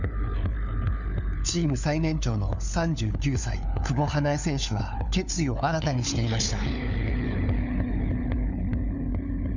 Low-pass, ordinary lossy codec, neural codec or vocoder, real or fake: 7.2 kHz; none; codec, 16 kHz, 4 kbps, FunCodec, trained on LibriTTS, 50 frames a second; fake